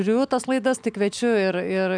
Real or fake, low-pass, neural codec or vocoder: real; 9.9 kHz; none